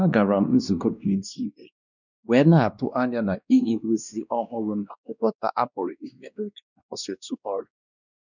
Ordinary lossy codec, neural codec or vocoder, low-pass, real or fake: none; codec, 16 kHz, 1 kbps, X-Codec, WavLM features, trained on Multilingual LibriSpeech; 7.2 kHz; fake